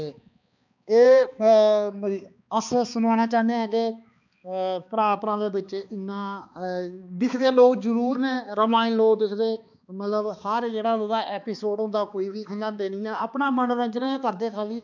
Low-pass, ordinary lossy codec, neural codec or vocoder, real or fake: 7.2 kHz; none; codec, 16 kHz, 2 kbps, X-Codec, HuBERT features, trained on balanced general audio; fake